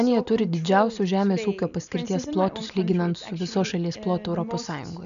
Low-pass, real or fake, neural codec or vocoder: 7.2 kHz; real; none